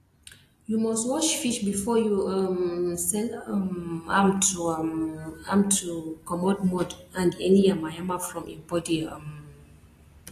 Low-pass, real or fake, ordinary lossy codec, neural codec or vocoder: 14.4 kHz; real; AAC, 48 kbps; none